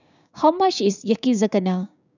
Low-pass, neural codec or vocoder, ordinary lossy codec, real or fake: 7.2 kHz; codec, 16 kHz, 6 kbps, DAC; none; fake